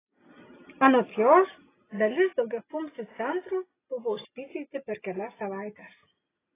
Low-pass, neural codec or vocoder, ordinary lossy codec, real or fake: 3.6 kHz; none; AAC, 16 kbps; real